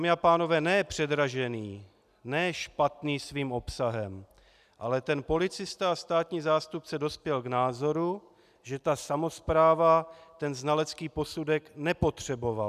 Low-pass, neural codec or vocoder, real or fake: 14.4 kHz; none; real